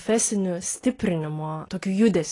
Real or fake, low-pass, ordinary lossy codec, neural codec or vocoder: real; 10.8 kHz; AAC, 32 kbps; none